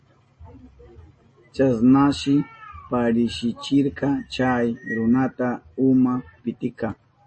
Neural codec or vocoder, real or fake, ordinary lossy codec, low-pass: none; real; MP3, 32 kbps; 9.9 kHz